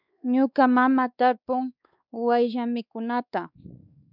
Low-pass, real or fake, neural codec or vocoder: 5.4 kHz; fake; codec, 16 kHz, 2 kbps, X-Codec, WavLM features, trained on Multilingual LibriSpeech